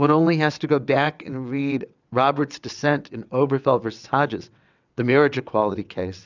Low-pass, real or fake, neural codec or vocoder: 7.2 kHz; fake; vocoder, 22.05 kHz, 80 mel bands, WaveNeXt